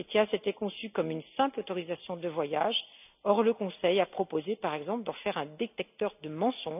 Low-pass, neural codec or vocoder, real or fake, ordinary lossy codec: 3.6 kHz; none; real; none